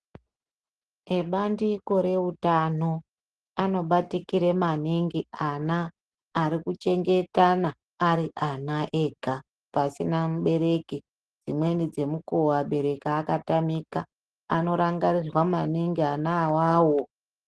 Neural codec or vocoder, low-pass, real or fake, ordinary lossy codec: none; 10.8 kHz; real; Opus, 16 kbps